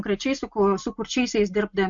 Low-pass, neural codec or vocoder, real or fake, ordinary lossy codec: 7.2 kHz; none; real; MP3, 48 kbps